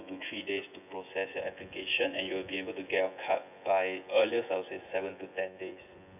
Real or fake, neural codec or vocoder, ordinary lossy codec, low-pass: fake; vocoder, 24 kHz, 100 mel bands, Vocos; none; 3.6 kHz